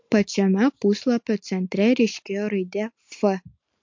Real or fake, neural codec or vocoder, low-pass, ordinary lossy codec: real; none; 7.2 kHz; MP3, 48 kbps